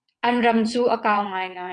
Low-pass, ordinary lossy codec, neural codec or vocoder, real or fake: 9.9 kHz; MP3, 96 kbps; vocoder, 22.05 kHz, 80 mel bands, WaveNeXt; fake